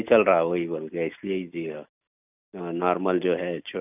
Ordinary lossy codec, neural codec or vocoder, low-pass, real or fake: none; none; 3.6 kHz; real